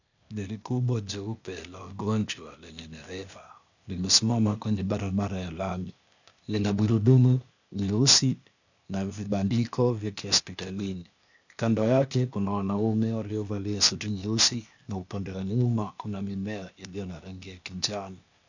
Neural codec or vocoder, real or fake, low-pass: codec, 16 kHz, 0.8 kbps, ZipCodec; fake; 7.2 kHz